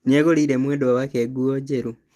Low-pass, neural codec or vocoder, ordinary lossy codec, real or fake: 14.4 kHz; none; Opus, 24 kbps; real